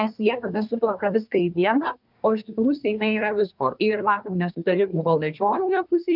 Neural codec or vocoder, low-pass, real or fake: codec, 24 kHz, 1 kbps, SNAC; 5.4 kHz; fake